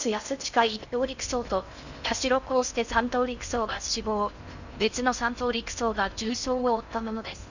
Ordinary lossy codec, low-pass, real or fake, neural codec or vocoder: none; 7.2 kHz; fake; codec, 16 kHz in and 24 kHz out, 0.6 kbps, FocalCodec, streaming, 4096 codes